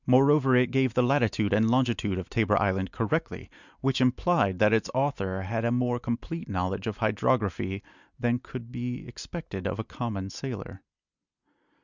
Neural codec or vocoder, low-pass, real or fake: none; 7.2 kHz; real